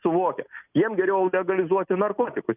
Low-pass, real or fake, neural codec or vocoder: 3.6 kHz; real; none